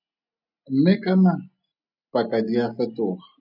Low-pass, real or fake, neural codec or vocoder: 5.4 kHz; real; none